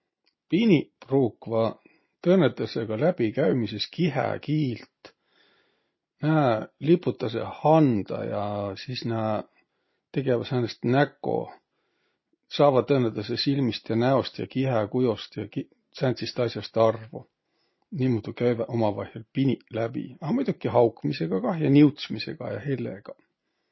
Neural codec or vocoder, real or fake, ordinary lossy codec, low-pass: none; real; MP3, 24 kbps; 7.2 kHz